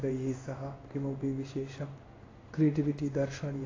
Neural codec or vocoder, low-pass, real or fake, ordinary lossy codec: codec, 16 kHz in and 24 kHz out, 1 kbps, XY-Tokenizer; 7.2 kHz; fake; AAC, 32 kbps